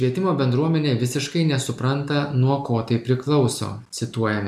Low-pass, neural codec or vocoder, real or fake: 14.4 kHz; none; real